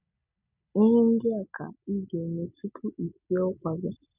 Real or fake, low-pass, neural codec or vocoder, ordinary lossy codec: real; 3.6 kHz; none; none